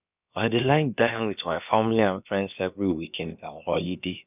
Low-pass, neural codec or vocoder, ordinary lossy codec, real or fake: 3.6 kHz; codec, 16 kHz, about 1 kbps, DyCAST, with the encoder's durations; none; fake